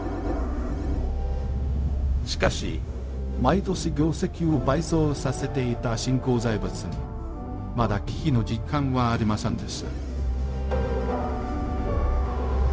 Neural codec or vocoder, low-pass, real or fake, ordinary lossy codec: codec, 16 kHz, 0.4 kbps, LongCat-Audio-Codec; none; fake; none